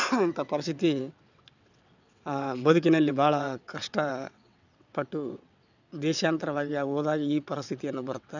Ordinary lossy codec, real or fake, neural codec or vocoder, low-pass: none; fake; vocoder, 22.05 kHz, 80 mel bands, WaveNeXt; 7.2 kHz